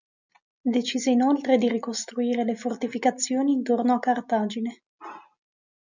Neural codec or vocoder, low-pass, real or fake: none; 7.2 kHz; real